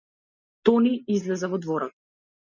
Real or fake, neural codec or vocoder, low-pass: real; none; 7.2 kHz